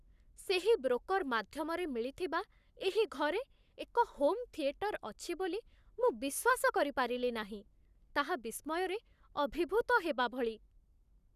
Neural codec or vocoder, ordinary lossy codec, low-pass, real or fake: codec, 44.1 kHz, 7.8 kbps, Pupu-Codec; none; 14.4 kHz; fake